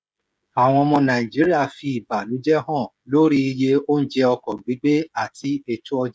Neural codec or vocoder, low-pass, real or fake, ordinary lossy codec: codec, 16 kHz, 16 kbps, FreqCodec, smaller model; none; fake; none